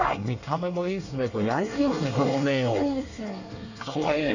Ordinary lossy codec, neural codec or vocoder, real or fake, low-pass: none; codec, 24 kHz, 1 kbps, SNAC; fake; 7.2 kHz